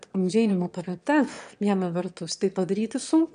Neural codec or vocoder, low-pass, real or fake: autoencoder, 22.05 kHz, a latent of 192 numbers a frame, VITS, trained on one speaker; 9.9 kHz; fake